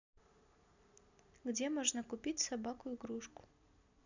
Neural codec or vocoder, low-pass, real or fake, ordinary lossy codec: none; 7.2 kHz; real; none